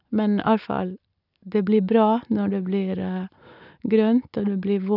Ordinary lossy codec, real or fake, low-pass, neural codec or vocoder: none; fake; 5.4 kHz; vocoder, 44.1 kHz, 128 mel bands every 256 samples, BigVGAN v2